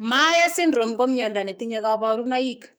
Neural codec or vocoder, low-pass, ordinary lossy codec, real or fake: codec, 44.1 kHz, 2.6 kbps, SNAC; none; none; fake